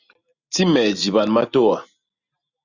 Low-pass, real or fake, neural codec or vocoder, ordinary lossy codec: 7.2 kHz; real; none; Opus, 64 kbps